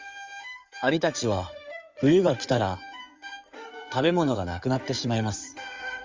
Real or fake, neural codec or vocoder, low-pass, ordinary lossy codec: fake; codec, 16 kHz in and 24 kHz out, 2.2 kbps, FireRedTTS-2 codec; 7.2 kHz; Opus, 32 kbps